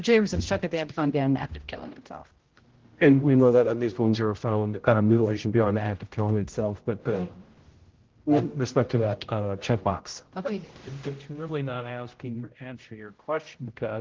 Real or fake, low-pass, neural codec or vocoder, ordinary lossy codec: fake; 7.2 kHz; codec, 16 kHz, 0.5 kbps, X-Codec, HuBERT features, trained on general audio; Opus, 16 kbps